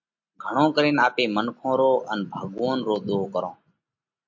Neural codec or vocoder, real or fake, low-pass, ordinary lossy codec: none; real; 7.2 kHz; MP3, 48 kbps